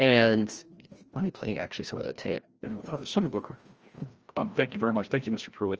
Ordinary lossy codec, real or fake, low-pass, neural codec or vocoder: Opus, 24 kbps; fake; 7.2 kHz; codec, 16 kHz, 1 kbps, FreqCodec, larger model